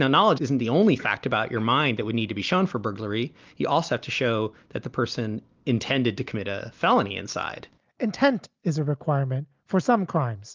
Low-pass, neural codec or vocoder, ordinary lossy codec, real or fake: 7.2 kHz; none; Opus, 24 kbps; real